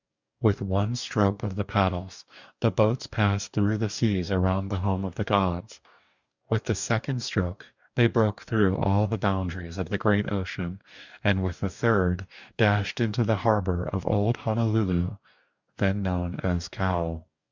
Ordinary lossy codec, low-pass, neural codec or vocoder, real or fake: Opus, 64 kbps; 7.2 kHz; codec, 44.1 kHz, 2.6 kbps, DAC; fake